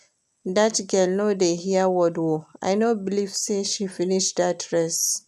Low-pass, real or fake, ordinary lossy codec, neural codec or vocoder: 14.4 kHz; real; none; none